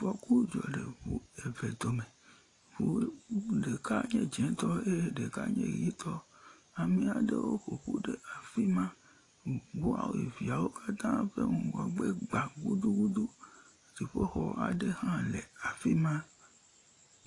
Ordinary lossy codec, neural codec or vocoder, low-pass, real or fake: AAC, 48 kbps; none; 10.8 kHz; real